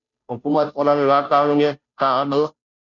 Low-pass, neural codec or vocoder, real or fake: 7.2 kHz; codec, 16 kHz, 0.5 kbps, FunCodec, trained on Chinese and English, 25 frames a second; fake